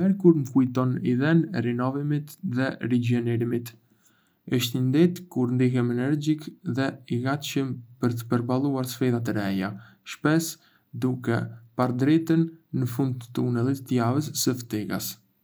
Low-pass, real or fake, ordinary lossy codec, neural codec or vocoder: none; real; none; none